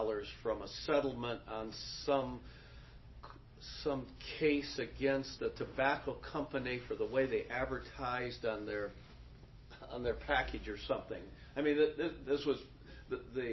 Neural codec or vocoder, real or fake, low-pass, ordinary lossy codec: none; real; 7.2 kHz; MP3, 24 kbps